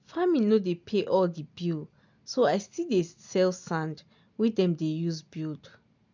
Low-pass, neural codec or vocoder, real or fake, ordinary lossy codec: 7.2 kHz; none; real; MP3, 64 kbps